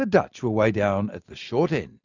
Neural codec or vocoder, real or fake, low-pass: none; real; 7.2 kHz